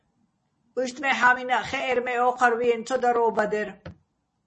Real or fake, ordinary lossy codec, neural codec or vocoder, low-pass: real; MP3, 32 kbps; none; 10.8 kHz